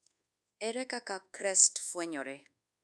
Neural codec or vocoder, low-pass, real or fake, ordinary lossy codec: codec, 24 kHz, 1.2 kbps, DualCodec; none; fake; none